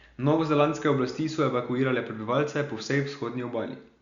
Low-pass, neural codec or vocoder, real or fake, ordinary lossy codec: 7.2 kHz; none; real; Opus, 64 kbps